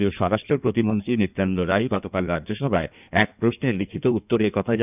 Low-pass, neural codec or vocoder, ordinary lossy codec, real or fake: 3.6 kHz; codec, 16 kHz in and 24 kHz out, 1.1 kbps, FireRedTTS-2 codec; none; fake